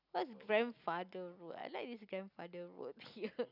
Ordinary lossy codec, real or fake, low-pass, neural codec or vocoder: none; real; 5.4 kHz; none